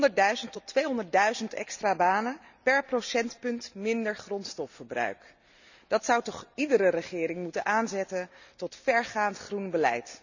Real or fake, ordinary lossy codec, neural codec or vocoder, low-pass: real; none; none; 7.2 kHz